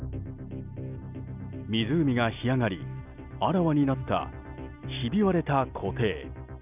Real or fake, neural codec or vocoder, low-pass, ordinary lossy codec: real; none; 3.6 kHz; none